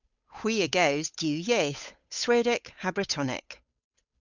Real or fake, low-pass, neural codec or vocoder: fake; 7.2 kHz; codec, 16 kHz, 8 kbps, FunCodec, trained on Chinese and English, 25 frames a second